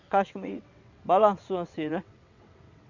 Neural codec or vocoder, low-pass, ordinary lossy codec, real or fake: vocoder, 22.05 kHz, 80 mel bands, WaveNeXt; 7.2 kHz; none; fake